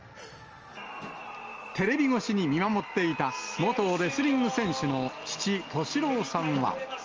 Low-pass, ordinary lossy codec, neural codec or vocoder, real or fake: 7.2 kHz; Opus, 24 kbps; none; real